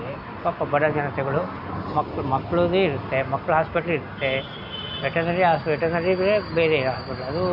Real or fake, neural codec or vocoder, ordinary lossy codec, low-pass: real; none; none; 5.4 kHz